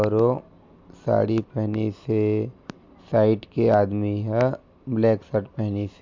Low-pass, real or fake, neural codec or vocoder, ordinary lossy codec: 7.2 kHz; real; none; MP3, 64 kbps